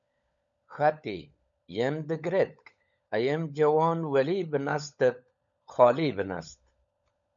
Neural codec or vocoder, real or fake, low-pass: codec, 16 kHz, 16 kbps, FunCodec, trained on LibriTTS, 50 frames a second; fake; 7.2 kHz